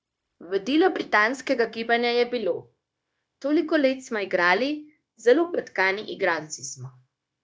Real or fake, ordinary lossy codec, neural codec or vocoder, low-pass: fake; none; codec, 16 kHz, 0.9 kbps, LongCat-Audio-Codec; none